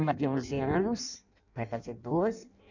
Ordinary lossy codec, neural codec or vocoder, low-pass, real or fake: none; codec, 16 kHz in and 24 kHz out, 0.6 kbps, FireRedTTS-2 codec; 7.2 kHz; fake